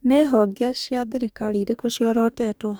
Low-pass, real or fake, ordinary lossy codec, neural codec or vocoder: none; fake; none; codec, 44.1 kHz, 2.6 kbps, DAC